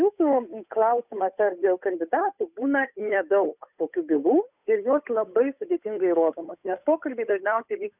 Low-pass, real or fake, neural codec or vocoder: 3.6 kHz; fake; codec, 16 kHz, 8 kbps, FunCodec, trained on Chinese and English, 25 frames a second